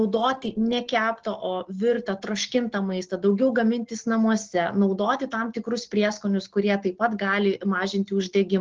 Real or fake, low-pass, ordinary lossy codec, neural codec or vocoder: real; 7.2 kHz; Opus, 16 kbps; none